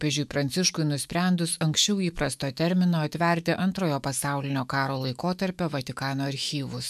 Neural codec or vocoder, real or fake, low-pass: autoencoder, 48 kHz, 128 numbers a frame, DAC-VAE, trained on Japanese speech; fake; 14.4 kHz